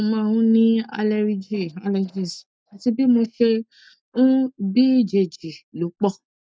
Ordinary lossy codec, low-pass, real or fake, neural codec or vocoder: none; none; real; none